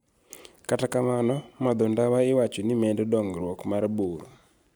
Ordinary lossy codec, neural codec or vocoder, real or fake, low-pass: none; none; real; none